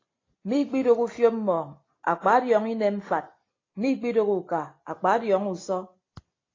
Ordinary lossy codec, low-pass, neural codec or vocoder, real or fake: AAC, 32 kbps; 7.2 kHz; none; real